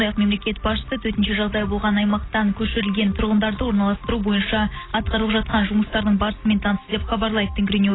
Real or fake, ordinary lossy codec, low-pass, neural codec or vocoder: real; AAC, 16 kbps; 7.2 kHz; none